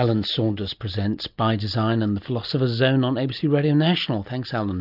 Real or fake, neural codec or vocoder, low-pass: real; none; 5.4 kHz